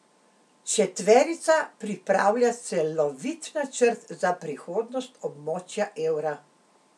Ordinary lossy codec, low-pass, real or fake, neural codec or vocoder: none; none; real; none